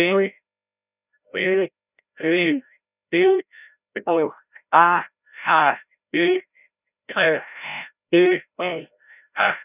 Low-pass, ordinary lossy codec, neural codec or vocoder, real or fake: 3.6 kHz; none; codec, 16 kHz, 0.5 kbps, FreqCodec, larger model; fake